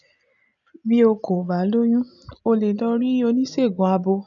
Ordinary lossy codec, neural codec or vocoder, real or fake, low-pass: none; none; real; 7.2 kHz